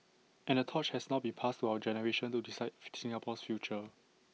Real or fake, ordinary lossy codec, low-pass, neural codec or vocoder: real; none; none; none